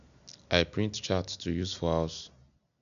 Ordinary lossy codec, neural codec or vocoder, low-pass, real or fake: none; none; 7.2 kHz; real